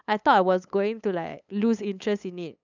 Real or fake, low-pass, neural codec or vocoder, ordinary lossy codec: fake; 7.2 kHz; codec, 16 kHz, 8 kbps, FunCodec, trained on LibriTTS, 25 frames a second; none